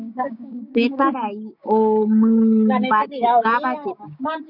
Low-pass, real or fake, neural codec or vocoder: 5.4 kHz; fake; codec, 16 kHz, 6 kbps, DAC